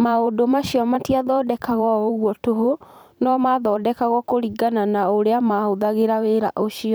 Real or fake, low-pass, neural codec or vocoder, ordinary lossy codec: fake; none; vocoder, 44.1 kHz, 128 mel bands every 512 samples, BigVGAN v2; none